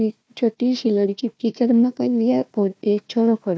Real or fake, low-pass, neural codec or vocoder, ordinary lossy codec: fake; none; codec, 16 kHz, 1 kbps, FunCodec, trained on Chinese and English, 50 frames a second; none